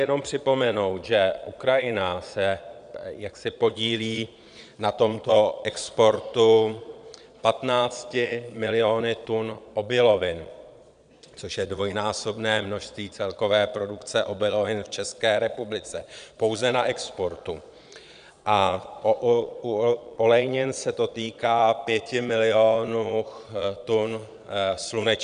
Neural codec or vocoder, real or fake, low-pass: vocoder, 22.05 kHz, 80 mel bands, Vocos; fake; 9.9 kHz